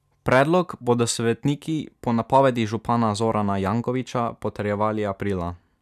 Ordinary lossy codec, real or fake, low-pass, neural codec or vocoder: none; real; 14.4 kHz; none